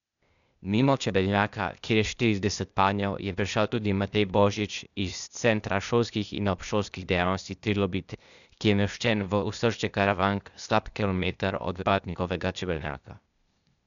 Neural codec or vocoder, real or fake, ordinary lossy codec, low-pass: codec, 16 kHz, 0.8 kbps, ZipCodec; fake; none; 7.2 kHz